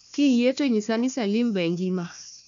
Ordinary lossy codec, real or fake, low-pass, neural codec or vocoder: none; fake; 7.2 kHz; codec, 16 kHz, 1 kbps, FunCodec, trained on Chinese and English, 50 frames a second